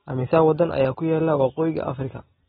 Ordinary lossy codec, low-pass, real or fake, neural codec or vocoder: AAC, 16 kbps; 10.8 kHz; real; none